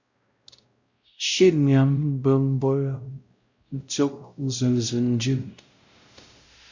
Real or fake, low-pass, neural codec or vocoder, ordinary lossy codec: fake; 7.2 kHz; codec, 16 kHz, 0.5 kbps, X-Codec, WavLM features, trained on Multilingual LibriSpeech; Opus, 64 kbps